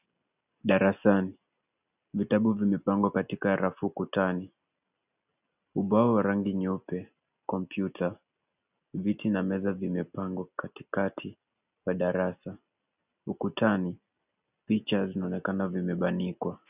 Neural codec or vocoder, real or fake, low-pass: none; real; 3.6 kHz